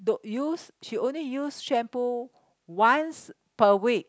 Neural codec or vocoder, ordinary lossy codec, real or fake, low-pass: none; none; real; none